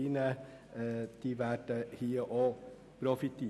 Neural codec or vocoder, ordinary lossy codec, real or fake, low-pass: none; none; real; none